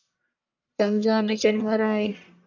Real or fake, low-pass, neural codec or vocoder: fake; 7.2 kHz; codec, 44.1 kHz, 1.7 kbps, Pupu-Codec